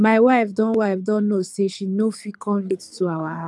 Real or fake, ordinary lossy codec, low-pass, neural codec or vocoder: fake; none; none; codec, 24 kHz, 6 kbps, HILCodec